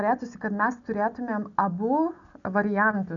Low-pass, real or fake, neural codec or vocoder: 7.2 kHz; real; none